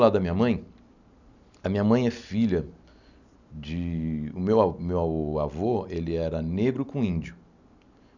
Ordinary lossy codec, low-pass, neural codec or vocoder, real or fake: none; 7.2 kHz; none; real